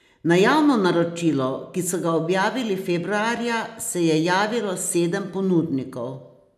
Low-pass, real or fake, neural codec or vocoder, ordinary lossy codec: 14.4 kHz; real; none; none